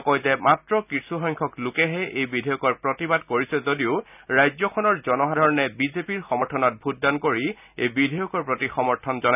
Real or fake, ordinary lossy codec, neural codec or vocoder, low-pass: real; none; none; 3.6 kHz